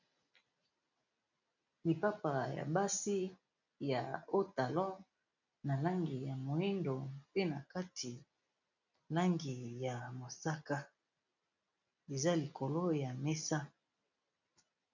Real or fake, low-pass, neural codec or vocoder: real; 7.2 kHz; none